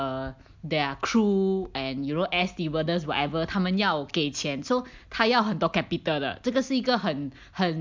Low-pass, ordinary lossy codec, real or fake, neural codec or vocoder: 7.2 kHz; MP3, 64 kbps; real; none